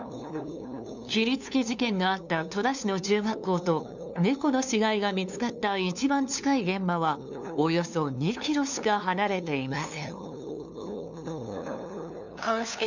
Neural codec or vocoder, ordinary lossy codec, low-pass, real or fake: codec, 16 kHz, 2 kbps, FunCodec, trained on LibriTTS, 25 frames a second; none; 7.2 kHz; fake